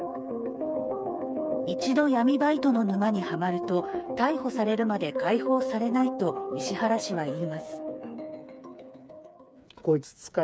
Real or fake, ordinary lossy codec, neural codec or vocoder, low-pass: fake; none; codec, 16 kHz, 4 kbps, FreqCodec, smaller model; none